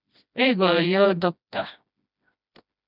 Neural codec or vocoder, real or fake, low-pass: codec, 16 kHz, 1 kbps, FreqCodec, smaller model; fake; 5.4 kHz